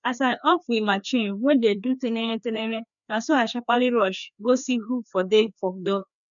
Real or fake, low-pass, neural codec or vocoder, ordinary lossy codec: fake; 7.2 kHz; codec, 16 kHz, 2 kbps, FreqCodec, larger model; none